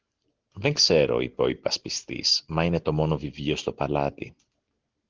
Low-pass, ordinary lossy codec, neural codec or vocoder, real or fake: 7.2 kHz; Opus, 16 kbps; none; real